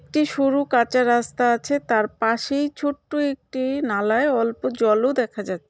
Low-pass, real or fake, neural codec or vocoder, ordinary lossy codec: none; real; none; none